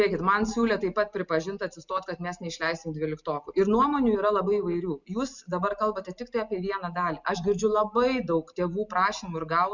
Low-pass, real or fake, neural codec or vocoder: 7.2 kHz; real; none